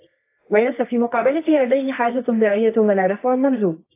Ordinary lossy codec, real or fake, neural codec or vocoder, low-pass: AAC, 24 kbps; fake; codec, 24 kHz, 0.9 kbps, WavTokenizer, medium music audio release; 3.6 kHz